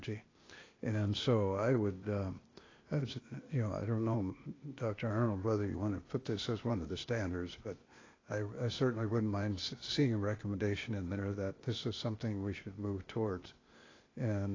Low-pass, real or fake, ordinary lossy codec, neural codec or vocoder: 7.2 kHz; fake; AAC, 32 kbps; codec, 16 kHz, 0.8 kbps, ZipCodec